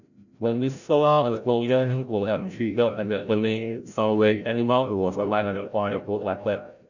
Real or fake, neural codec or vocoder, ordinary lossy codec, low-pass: fake; codec, 16 kHz, 0.5 kbps, FreqCodec, larger model; none; 7.2 kHz